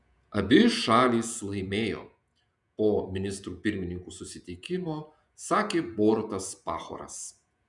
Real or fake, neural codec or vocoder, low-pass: fake; vocoder, 48 kHz, 128 mel bands, Vocos; 10.8 kHz